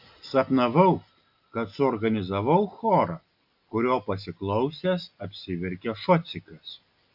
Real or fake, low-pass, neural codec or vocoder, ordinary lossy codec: real; 5.4 kHz; none; AAC, 48 kbps